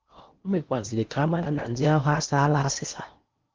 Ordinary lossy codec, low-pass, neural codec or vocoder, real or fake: Opus, 32 kbps; 7.2 kHz; codec, 16 kHz in and 24 kHz out, 0.8 kbps, FocalCodec, streaming, 65536 codes; fake